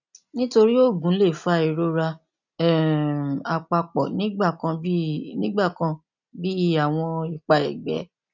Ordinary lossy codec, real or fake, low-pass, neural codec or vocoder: none; real; 7.2 kHz; none